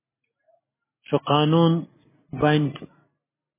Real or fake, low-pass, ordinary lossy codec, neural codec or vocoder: real; 3.6 kHz; MP3, 16 kbps; none